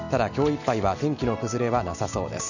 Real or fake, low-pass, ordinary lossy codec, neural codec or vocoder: real; 7.2 kHz; none; none